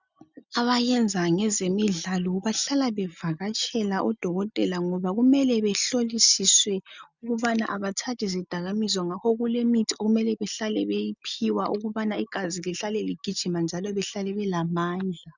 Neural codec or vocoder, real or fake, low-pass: none; real; 7.2 kHz